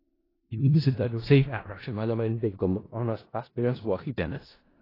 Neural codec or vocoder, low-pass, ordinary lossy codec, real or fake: codec, 16 kHz in and 24 kHz out, 0.4 kbps, LongCat-Audio-Codec, four codebook decoder; 5.4 kHz; AAC, 24 kbps; fake